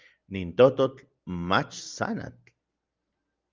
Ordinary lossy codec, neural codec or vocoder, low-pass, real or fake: Opus, 24 kbps; none; 7.2 kHz; real